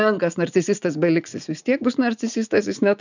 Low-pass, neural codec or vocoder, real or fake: 7.2 kHz; vocoder, 44.1 kHz, 80 mel bands, Vocos; fake